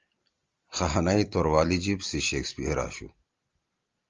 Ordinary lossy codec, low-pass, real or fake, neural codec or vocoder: Opus, 32 kbps; 7.2 kHz; real; none